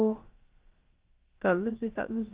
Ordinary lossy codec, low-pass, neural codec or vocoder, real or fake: Opus, 16 kbps; 3.6 kHz; codec, 16 kHz, about 1 kbps, DyCAST, with the encoder's durations; fake